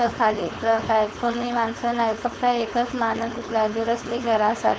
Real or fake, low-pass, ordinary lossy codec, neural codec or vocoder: fake; none; none; codec, 16 kHz, 4.8 kbps, FACodec